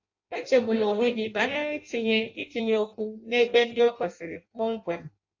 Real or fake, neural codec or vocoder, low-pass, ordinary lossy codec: fake; codec, 16 kHz in and 24 kHz out, 0.6 kbps, FireRedTTS-2 codec; 7.2 kHz; AAC, 48 kbps